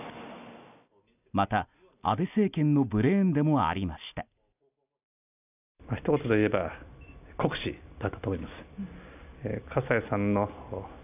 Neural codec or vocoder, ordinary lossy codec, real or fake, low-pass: none; none; real; 3.6 kHz